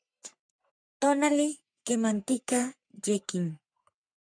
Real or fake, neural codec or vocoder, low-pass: fake; codec, 44.1 kHz, 3.4 kbps, Pupu-Codec; 9.9 kHz